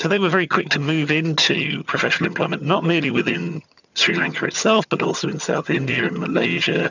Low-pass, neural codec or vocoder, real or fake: 7.2 kHz; vocoder, 22.05 kHz, 80 mel bands, HiFi-GAN; fake